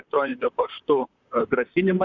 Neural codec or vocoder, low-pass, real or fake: vocoder, 44.1 kHz, 128 mel bands, Pupu-Vocoder; 7.2 kHz; fake